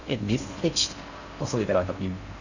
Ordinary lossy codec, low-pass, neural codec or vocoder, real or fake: none; 7.2 kHz; codec, 16 kHz in and 24 kHz out, 0.6 kbps, FocalCodec, streaming, 4096 codes; fake